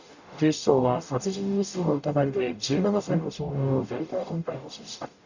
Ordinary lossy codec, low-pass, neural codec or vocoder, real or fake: none; 7.2 kHz; codec, 44.1 kHz, 0.9 kbps, DAC; fake